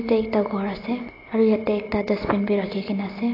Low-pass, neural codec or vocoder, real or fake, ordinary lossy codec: 5.4 kHz; none; real; AAC, 24 kbps